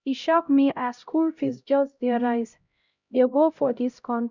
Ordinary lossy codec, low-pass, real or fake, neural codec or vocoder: none; 7.2 kHz; fake; codec, 16 kHz, 0.5 kbps, X-Codec, HuBERT features, trained on LibriSpeech